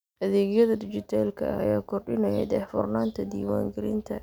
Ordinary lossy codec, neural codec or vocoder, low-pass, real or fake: none; none; none; real